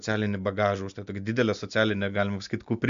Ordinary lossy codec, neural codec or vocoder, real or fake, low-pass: MP3, 64 kbps; none; real; 7.2 kHz